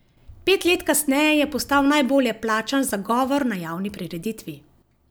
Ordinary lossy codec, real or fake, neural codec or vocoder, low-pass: none; real; none; none